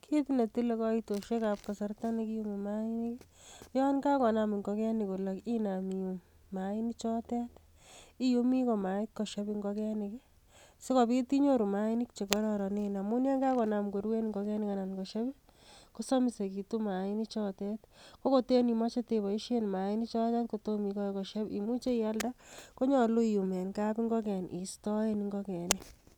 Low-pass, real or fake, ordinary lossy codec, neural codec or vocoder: 19.8 kHz; real; none; none